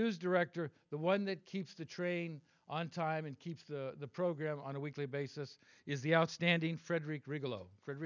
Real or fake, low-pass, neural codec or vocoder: real; 7.2 kHz; none